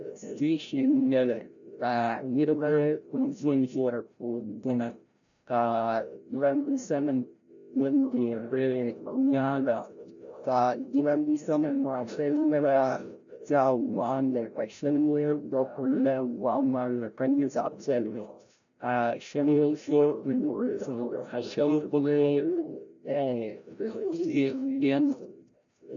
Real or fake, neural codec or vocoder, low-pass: fake; codec, 16 kHz, 0.5 kbps, FreqCodec, larger model; 7.2 kHz